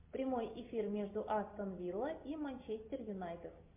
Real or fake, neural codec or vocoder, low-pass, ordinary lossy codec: real; none; 3.6 kHz; MP3, 24 kbps